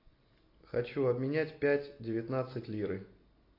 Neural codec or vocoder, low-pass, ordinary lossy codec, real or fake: none; 5.4 kHz; AAC, 32 kbps; real